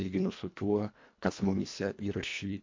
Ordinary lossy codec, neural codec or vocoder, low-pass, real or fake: MP3, 48 kbps; codec, 24 kHz, 1.5 kbps, HILCodec; 7.2 kHz; fake